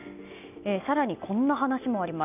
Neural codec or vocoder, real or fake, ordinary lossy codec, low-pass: none; real; none; 3.6 kHz